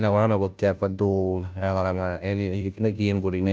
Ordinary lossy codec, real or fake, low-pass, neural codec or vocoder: none; fake; none; codec, 16 kHz, 0.5 kbps, FunCodec, trained on Chinese and English, 25 frames a second